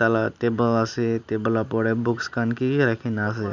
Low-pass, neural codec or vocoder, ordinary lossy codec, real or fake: 7.2 kHz; none; none; real